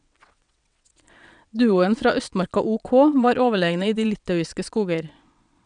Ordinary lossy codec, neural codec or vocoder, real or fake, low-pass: none; vocoder, 22.05 kHz, 80 mel bands, WaveNeXt; fake; 9.9 kHz